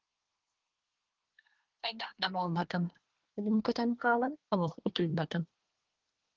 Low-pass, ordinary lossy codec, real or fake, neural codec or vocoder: 7.2 kHz; Opus, 16 kbps; fake; codec, 24 kHz, 1 kbps, SNAC